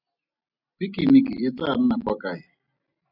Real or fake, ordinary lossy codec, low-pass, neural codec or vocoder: real; AAC, 48 kbps; 5.4 kHz; none